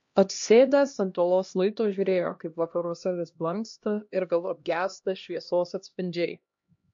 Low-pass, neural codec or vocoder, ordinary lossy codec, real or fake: 7.2 kHz; codec, 16 kHz, 1 kbps, X-Codec, HuBERT features, trained on LibriSpeech; MP3, 48 kbps; fake